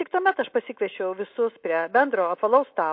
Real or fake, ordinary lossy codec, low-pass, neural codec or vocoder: real; MP3, 32 kbps; 5.4 kHz; none